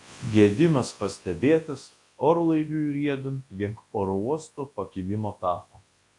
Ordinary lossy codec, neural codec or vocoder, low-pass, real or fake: AAC, 48 kbps; codec, 24 kHz, 0.9 kbps, WavTokenizer, large speech release; 10.8 kHz; fake